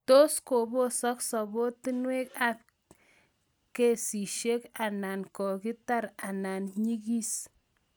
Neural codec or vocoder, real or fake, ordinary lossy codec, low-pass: none; real; none; none